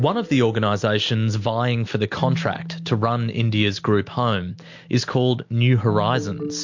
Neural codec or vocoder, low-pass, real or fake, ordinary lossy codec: none; 7.2 kHz; real; MP3, 48 kbps